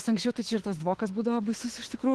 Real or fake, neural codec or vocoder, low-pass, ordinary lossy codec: fake; autoencoder, 48 kHz, 32 numbers a frame, DAC-VAE, trained on Japanese speech; 10.8 kHz; Opus, 16 kbps